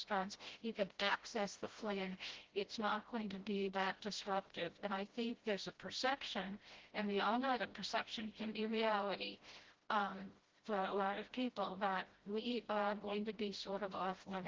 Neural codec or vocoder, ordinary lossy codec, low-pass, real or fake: codec, 16 kHz, 0.5 kbps, FreqCodec, smaller model; Opus, 16 kbps; 7.2 kHz; fake